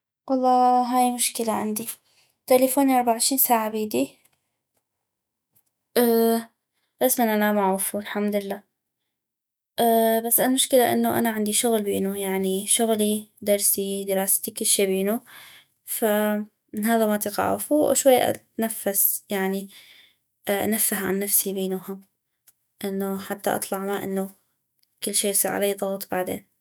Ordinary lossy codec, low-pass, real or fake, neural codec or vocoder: none; none; fake; autoencoder, 48 kHz, 128 numbers a frame, DAC-VAE, trained on Japanese speech